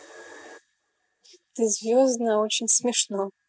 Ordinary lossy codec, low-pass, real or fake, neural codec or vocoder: none; none; real; none